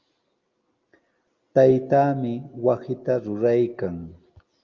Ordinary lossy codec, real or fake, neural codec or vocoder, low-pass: Opus, 32 kbps; real; none; 7.2 kHz